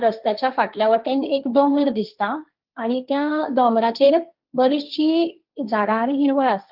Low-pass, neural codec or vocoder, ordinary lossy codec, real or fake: 5.4 kHz; codec, 16 kHz, 1.1 kbps, Voila-Tokenizer; Opus, 24 kbps; fake